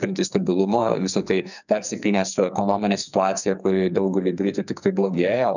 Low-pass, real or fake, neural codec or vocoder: 7.2 kHz; fake; codec, 44.1 kHz, 2.6 kbps, SNAC